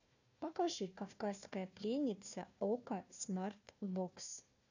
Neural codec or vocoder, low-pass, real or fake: codec, 16 kHz, 1 kbps, FunCodec, trained on Chinese and English, 50 frames a second; 7.2 kHz; fake